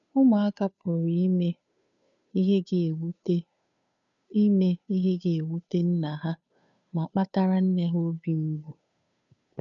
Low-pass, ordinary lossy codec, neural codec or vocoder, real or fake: 7.2 kHz; none; codec, 16 kHz, 2 kbps, FunCodec, trained on Chinese and English, 25 frames a second; fake